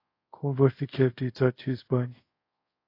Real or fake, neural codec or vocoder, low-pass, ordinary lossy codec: fake; codec, 24 kHz, 0.5 kbps, DualCodec; 5.4 kHz; AAC, 32 kbps